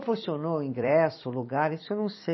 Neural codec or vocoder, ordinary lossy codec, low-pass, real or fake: vocoder, 44.1 kHz, 128 mel bands every 256 samples, BigVGAN v2; MP3, 24 kbps; 7.2 kHz; fake